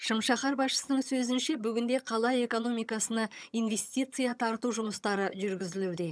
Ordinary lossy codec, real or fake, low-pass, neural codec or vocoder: none; fake; none; vocoder, 22.05 kHz, 80 mel bands, HiFi-GAN